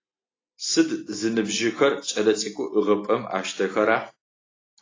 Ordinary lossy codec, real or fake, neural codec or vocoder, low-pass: AAC, 32 kbps; real; none; 7.2 kHz